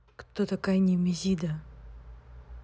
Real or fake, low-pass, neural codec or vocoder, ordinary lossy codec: real; none; none; none